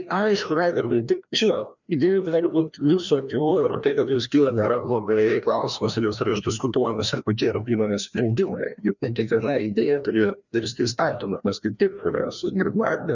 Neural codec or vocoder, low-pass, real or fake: codec, 16 kHz, 1 kbps, FreqCodec, larger model; 7.2 kHz; fake